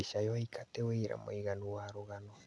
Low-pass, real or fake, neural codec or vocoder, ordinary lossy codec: none; real; none; none